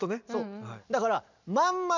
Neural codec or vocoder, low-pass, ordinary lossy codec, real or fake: none; 7.2 kHz; none; real